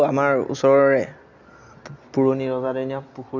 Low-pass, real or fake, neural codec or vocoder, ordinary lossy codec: 7.2 kHz; real; none; none